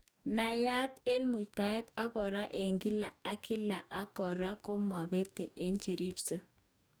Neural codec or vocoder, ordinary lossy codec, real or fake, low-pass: codec, 44.1 kHz, 2.6 kbps, DAC; none; fake; none